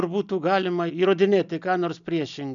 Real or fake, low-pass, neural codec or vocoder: real; 7.2 kHz; none